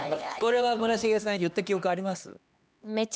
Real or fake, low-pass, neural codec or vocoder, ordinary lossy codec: fake; none; codec, 16 kHz, 2 kbps, X-Codec, HuBERT features, trained on LibriSpeech; none